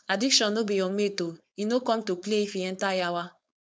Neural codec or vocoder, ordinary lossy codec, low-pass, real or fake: codec, 16 kHz, 4.8 kbps, FACodec; none; none; fake